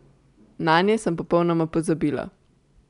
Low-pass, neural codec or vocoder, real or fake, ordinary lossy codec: 10.8 kHz; none; real; none